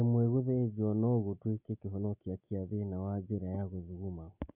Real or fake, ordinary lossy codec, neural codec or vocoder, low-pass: real; none; none; 3.6 kHz